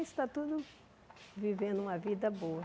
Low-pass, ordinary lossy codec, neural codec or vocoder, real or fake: none; none; none; real